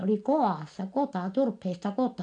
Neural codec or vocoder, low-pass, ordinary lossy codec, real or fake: none; 9.9 kHz; AAC, 64 kbps; real